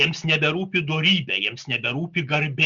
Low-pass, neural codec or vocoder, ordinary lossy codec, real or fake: 7.2 kHz; none; Opus, 64 kbps; real